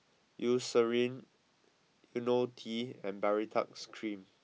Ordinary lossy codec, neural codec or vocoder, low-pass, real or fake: none; none; none; real